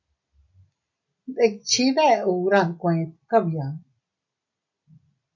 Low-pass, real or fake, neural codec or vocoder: 7.2 kHz; real; none